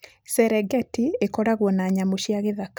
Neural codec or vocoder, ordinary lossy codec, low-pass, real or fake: none; none; none; real